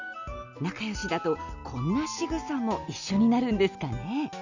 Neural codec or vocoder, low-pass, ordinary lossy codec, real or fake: none; 7.2 kHz; none; real